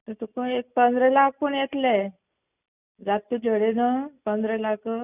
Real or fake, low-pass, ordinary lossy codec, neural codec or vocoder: real; 3.6 kHz; none; none